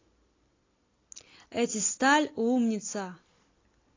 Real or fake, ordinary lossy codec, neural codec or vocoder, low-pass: real; AAC, 32 kbps; none; 7.2 kHz